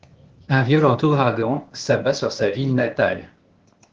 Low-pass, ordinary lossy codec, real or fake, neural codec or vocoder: 7.2 kHz; Opus, 16 kbps; fake; codec, 16 kHz, 0.8 kbps, ZipCodec